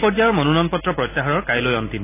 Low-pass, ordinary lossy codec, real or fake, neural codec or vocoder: 3.6 kHz; AAC, 24 kbps; real; none